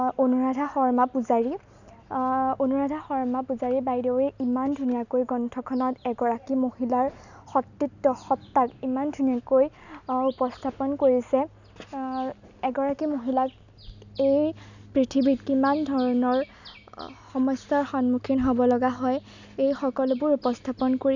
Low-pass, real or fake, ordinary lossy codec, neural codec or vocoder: 7.2 kHz; real; none; none